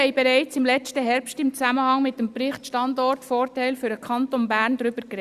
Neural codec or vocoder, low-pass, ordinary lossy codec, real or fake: none; 14.4 kHz; Opus, 64 kbps; real